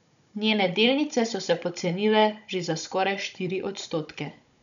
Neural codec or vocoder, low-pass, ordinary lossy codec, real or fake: codec, 16 kHz, 16 kbps, FunCodec, trained on Chinese and English, 50 frames a second; 7.2 kHz; none; fake